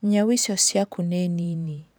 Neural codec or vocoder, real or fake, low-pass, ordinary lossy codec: vocoder, 44.1 kHz, 128 mel bands every 512 samples, BigVGAN v2; fake; none; none